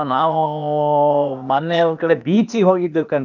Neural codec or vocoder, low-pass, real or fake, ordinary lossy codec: codec, 16 kHz, 0.8 kbps, ZipCodec; 7.2 kHz; fake; none